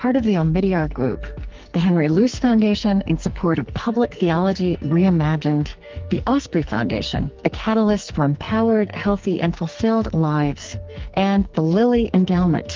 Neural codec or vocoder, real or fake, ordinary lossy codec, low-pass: codec, 44.1 kHz, 2.6 kbps, SNAC; fake; Opus, 32 kbps; 7.2 kHz